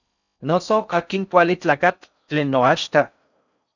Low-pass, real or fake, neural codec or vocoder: 7.2 kHz; fake; codec, 16 kHz in and 24 kHz out, 0.6 kbps, FocalCodec, streaming, 4096 codes